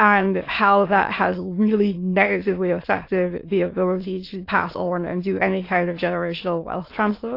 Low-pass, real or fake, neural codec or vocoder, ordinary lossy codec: 5.4 kHz; fake; autoencoder, 22.05 kHz, a latent of 192 numbers a frame, VITS, trained on many speakers; AAC, 32 kbps